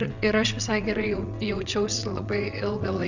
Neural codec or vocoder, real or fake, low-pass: vocoder, 22.05 kHz, 80 mel bands, WaveNeXt; fake; 7.2 kHz